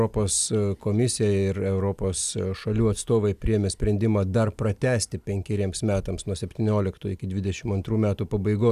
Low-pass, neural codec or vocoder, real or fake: 14.4 kHz; none; real